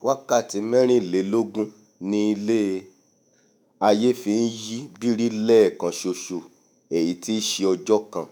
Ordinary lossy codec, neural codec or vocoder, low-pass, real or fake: none; autoencoder, 48 kHz, 128 numbers a frame, DAC-VAE, trained on Japanese speech; none; fake